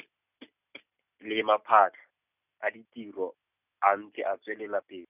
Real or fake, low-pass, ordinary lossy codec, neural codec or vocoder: real; 3.6 kHz; none; none